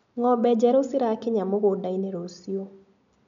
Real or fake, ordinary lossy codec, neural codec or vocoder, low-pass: real; MP3, 64 kbps; none; 7.2 kHz